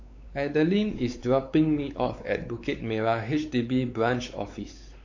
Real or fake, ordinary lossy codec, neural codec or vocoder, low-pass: fake; AAC, 48 kbps; codec, 16 kHz, 4 kbps, X-Codec, WavLM features, trained on Multilingual LibriSpeech; 7.2 kHz